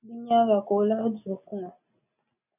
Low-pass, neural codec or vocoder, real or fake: 3.6 kHz; none; real